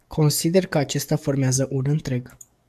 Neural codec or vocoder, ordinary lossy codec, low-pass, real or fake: codec, 44.1 kHz, 7.8 kbps, DAC; AAC, 96 kbps; 14.4 kHz; fake